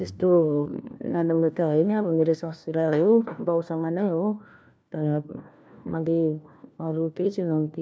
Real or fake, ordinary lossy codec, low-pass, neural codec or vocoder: fake; none; none; codec, 16 kHz, 1 kbps, FunCodec, trained on LibriTTS, 50 frames a second